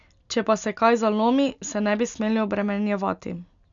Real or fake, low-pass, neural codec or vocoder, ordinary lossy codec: real; 7.2 kHz; none; none